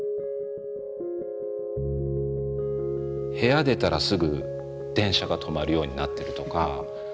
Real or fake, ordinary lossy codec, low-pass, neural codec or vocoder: real; none; none; none